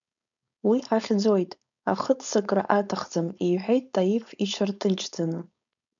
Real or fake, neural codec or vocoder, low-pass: fake; codec, 16 kHz, 4.8 kbps, FACodec; 7.2 kHz